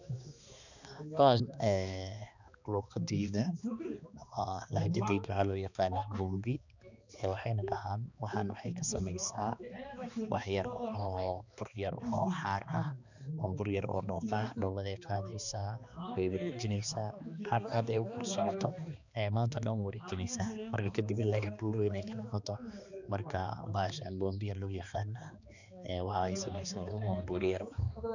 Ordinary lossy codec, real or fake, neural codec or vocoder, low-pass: none; fake; codec, 16 kHz, 2 kbps, X-Codec, HuBERT features, trained on balanced general audio; 7.2 kHz